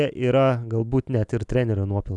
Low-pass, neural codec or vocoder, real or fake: 10.8 kHz; none; real